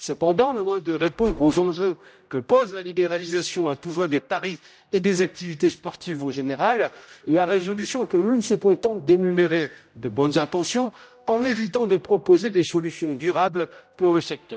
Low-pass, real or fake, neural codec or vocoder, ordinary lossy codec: none; fake; codec, 16 kHz, 0.5 kbps, X-Codec, HuBERT features, trained on general audio; none